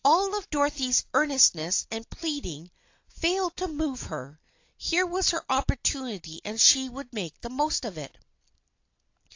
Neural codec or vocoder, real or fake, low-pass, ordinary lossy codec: none; real; 7.2 kHz; AAC, 48 kbps